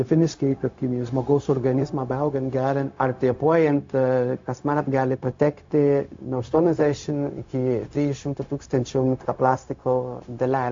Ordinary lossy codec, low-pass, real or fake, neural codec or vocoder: AAC, 48 kbps; 7.2 kHz; fake; codec, 16 kHz, 0.4 kbps, LongCat-Audio-Codec